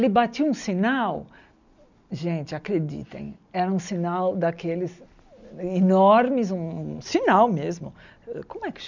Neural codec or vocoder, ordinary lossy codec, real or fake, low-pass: none; none; real; 7.2 kHz